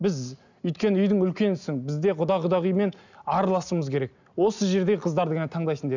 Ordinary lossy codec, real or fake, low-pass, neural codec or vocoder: none; real; 7.2 kHz; none